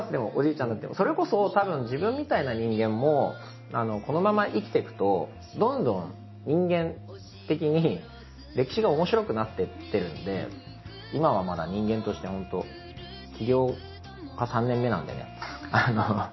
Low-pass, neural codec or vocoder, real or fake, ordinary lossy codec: 7.2 kHz; none; real; MP3, 24 kbps